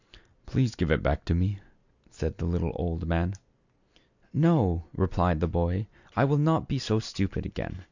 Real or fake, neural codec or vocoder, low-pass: real; none; 7.2 kHz